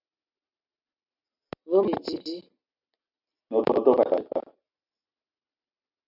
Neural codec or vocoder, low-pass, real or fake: none; 5.4 kHz; real